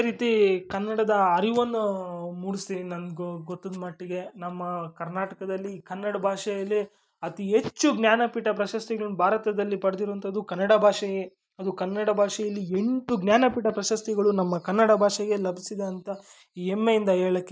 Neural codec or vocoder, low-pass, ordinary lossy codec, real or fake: none; none; none; real